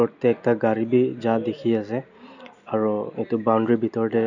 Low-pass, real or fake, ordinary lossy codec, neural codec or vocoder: 7.2 kHz; real; none; none